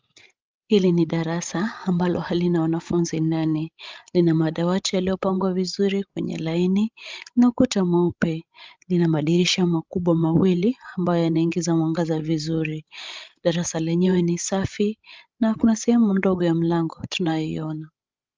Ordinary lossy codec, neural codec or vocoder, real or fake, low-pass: Opus, 24 kbps; codec, 16 kHz, 16 kbps, FreqCodec, larger model; fake; 7.2 kHz